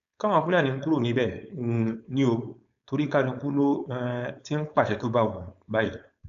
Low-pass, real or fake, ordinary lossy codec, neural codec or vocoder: 7.2 kHz; fake; none; codec, 16 kHz, 4.8 kbps, FACodec